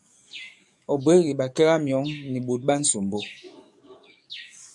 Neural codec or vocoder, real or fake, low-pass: codec, 44.1 kHz, 7.8 kbps, DAC; fake; 10.8 kHz